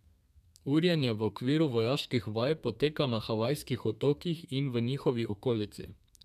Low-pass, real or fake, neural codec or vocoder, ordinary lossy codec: 14.4 kHz; fake; codec, 32 kHz, 1.9 kbps, SNAC; none